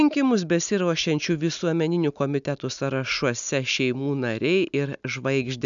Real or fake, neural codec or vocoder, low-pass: real; none; 7.2 kHz